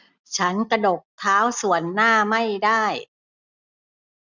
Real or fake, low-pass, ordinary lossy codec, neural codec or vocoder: real; 7.2 kHz; none; none